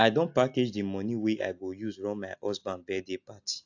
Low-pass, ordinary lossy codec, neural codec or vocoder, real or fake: 7.2 kHz; AAC, 48 kbps; none; real